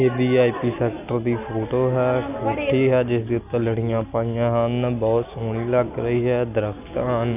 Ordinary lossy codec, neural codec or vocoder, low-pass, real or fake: none; none; 3.6 kHz; real